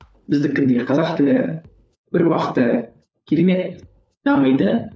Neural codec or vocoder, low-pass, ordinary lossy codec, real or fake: codec, 16 kHz, 4 kbps, FunCodec, trained on LibriTTS, 50 frames a second; none; none; fake